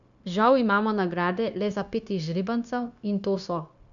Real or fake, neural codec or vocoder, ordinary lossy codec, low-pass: fake; codec, 16 kHz, 0.9 kbps, LongCat-Audio-Codec; none; 7.2 kHz